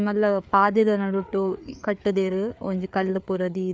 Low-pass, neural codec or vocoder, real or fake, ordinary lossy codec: none; codec, 16 kHz, 4 kbps, FreqCodec, larger model; fake; none